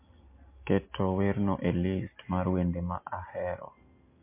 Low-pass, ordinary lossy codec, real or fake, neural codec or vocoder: 3.6 kHz; MP3, 24 kbps; real; none